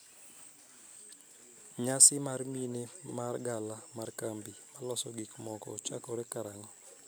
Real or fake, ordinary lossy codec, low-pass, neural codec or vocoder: real; none; none; none